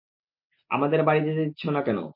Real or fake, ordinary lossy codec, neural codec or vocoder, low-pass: real; MP3, 32 kbps; none; 5.4 kHz